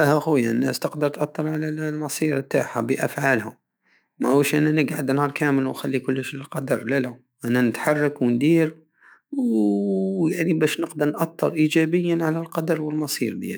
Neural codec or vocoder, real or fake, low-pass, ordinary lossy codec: autoencoder, 48 kHz, 128 numbers a frame, DAC-VAE, trained on Japanese speech; fake; none; none